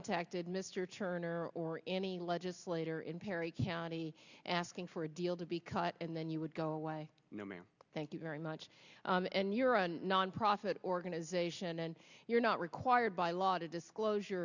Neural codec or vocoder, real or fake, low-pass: none; real; 7.2 kHz